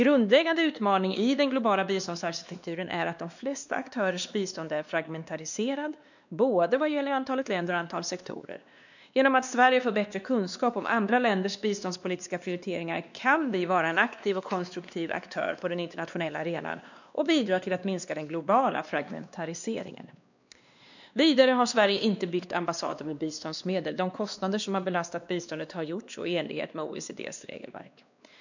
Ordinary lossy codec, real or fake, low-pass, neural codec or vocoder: none; fake; 7.2 kHz; codec, 16 kHz, 2 kbps, X-Codec, WavLM features, trained on Multilingual LibriSpeech